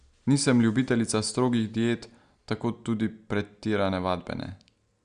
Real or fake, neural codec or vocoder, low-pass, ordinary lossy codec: real; none; 9.9 kHz; Opus, 64 kbps